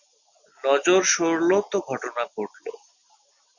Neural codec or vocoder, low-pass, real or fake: none; 7.2 kHz; real